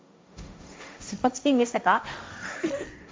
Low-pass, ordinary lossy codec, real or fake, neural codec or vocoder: none; none; fake; codec, 16 kHz, 1.1 kbps, Voila-Tokenizer